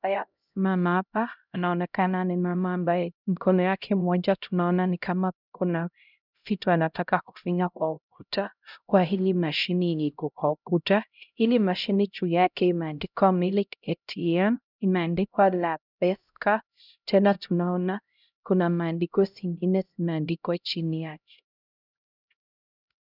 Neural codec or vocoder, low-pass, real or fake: codec, 16 kHz, 0.5 kbps, X-Codec, HuBERT features, trained on LibriSpeech; 5.4 kHz; fake